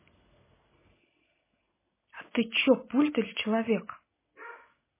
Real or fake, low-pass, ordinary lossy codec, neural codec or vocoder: real; 3.6 kHz; MP3, 16 kbps; none